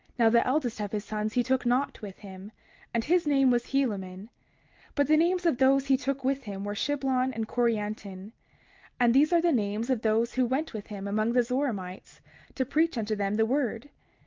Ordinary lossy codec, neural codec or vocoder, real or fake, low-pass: Opus, 24 kbps; none; real; 7.2 kHz